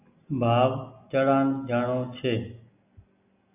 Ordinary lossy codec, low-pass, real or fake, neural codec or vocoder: AAC, 32 kbps; 3.6 kHz; real; none